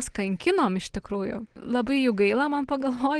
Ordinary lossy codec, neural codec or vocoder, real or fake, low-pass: Opus, 16 kbps; none; real; 9.9 kHz